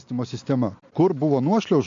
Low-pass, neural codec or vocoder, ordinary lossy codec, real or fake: 7.2 kHz; none; MP3, 48 kbps; real